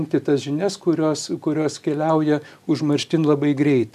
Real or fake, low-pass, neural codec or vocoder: real; 14.4 kHz; none